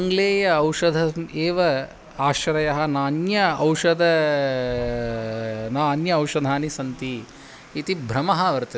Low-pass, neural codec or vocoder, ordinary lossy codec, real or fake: none; none; none; real